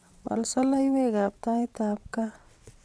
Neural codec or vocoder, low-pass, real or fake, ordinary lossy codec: vocoder, 22.05 kHz, 80 mel bands, WaveNeXt; none; fake; none